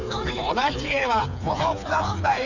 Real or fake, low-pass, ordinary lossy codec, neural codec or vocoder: fake; 7.2 kHz; none; codec, 16 kHz, 4 kbps, FreqCodec, smaller model